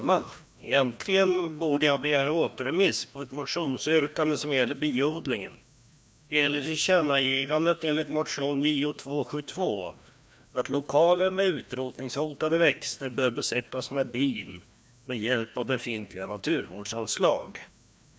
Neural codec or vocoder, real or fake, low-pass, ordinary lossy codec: codec, 16 kHz, 1 kbps, FreqCodec, larger model; fake; none; none